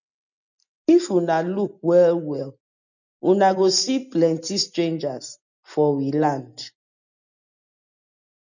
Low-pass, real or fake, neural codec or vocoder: 7.2 kHz; real; none